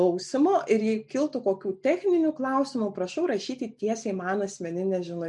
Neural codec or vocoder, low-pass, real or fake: none; 10.8 kHz; real